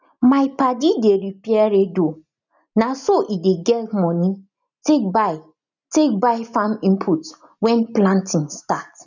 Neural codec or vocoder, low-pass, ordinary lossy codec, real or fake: none; 7.2 kHz; none; real